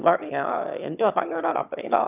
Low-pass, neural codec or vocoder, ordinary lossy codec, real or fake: 3.6 kHz; autoencoder, 22.05 kHz, a latent of 192 numbers a frame, VITS, trained on one speaker; none; fake